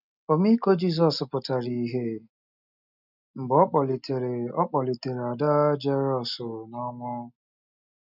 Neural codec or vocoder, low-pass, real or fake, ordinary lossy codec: none; 5.4 kHz; real; none